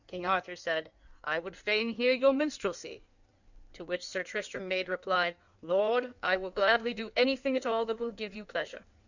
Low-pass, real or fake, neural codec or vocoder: 7.2 kHz; fake; codec, 16 kHz in and 24 kHz out, 1.1 kbps, FireRedTTS-2 codec